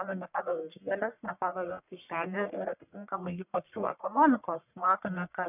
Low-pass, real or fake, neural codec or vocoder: 3.6 kHz; fake; codec, 44.1 kHz, 1.7 kbps, Pupu-Codec